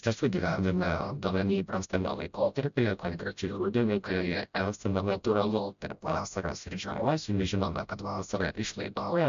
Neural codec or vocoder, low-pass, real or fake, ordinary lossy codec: codec, 16 kHz, 0.5 kbps, FreqCodec, smaller model; 7.2 kHz; fake; MP3, 64 kbps